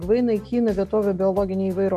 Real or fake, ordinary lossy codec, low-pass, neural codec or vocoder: real; MP3, 96 kbps; 14.4 kHz; none